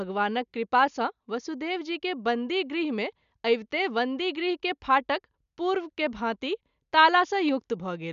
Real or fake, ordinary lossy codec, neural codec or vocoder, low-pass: real; none; none; 7.2 kHz